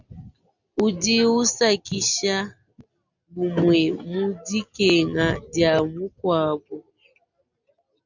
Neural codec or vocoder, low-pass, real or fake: none; 7.2 kHz; real